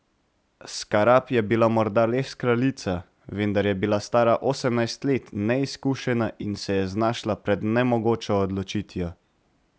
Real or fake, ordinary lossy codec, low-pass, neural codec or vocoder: real; none; none; none